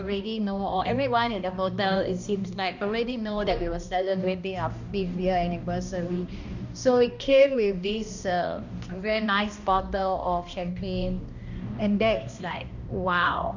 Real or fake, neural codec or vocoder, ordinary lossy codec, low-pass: fake; codec, 16 kHz, 1 kbps, X-Codec, HuBERT features, trained on balanced general audio; none; 7.2 kHz